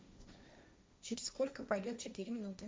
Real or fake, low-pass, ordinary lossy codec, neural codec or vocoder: fake; none; none; codec, 16 kHz, 1.1 kbps, Voila-Tokenizer